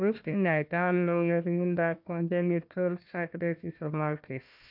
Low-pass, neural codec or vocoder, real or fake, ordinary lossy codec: 5.4 kHz; codec, 16 kHz, 1 kbps, FunCodec, trained on Chinese and English, 50 frames a second; fake; none